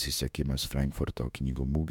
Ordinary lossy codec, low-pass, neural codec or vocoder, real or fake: MP3, 96 kbps; 19.8 kHz; autoencoder, 48 kHz, 32 numbers a frame, DAC-VAE, trained on Japanese speech; fake